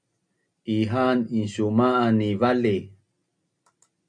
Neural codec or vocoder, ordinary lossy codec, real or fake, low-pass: none; MP3, 64 kbps; real; 9.9 kHz